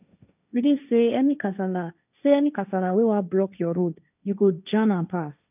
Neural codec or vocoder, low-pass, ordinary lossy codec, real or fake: codec, 16 kHz, 1.1 kbps, Voila-Tokenizer; 3.6 kHz; none; fake